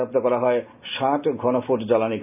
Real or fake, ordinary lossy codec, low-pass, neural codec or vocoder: real; none; 3.6 kHz; none